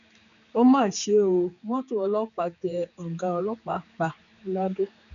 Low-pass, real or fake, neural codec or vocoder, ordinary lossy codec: 7.2 kHz; fake; codec, 16 kHz, 4 kbps, X-Codec, HuBERT features, trained on general audio; none